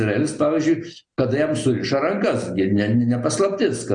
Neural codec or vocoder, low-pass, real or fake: none; 9.9 kHz; real